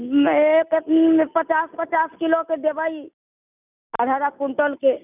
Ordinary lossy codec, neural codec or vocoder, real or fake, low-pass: none; none; real; 3.6 kHz